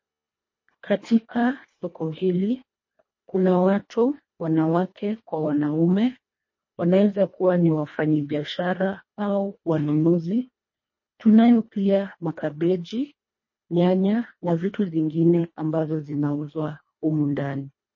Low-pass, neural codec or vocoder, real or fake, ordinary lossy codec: 7.2 kHz; codec, 24 kHz, 1.5 kbps, HILCodec; fake; MP3, 32 kbps